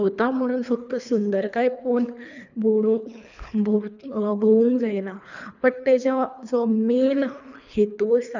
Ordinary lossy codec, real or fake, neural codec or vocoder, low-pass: none; fake; codec, 24 kHz, 3 kbps, HILCodec; 7.2 kHz